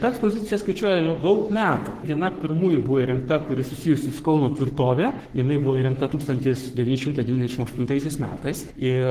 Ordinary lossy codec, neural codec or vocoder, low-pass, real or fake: Opus, 16 kbps; codec, 44.1 kHz, 3.4 kbps, Pupu-Codec; 14.4 kHz; fake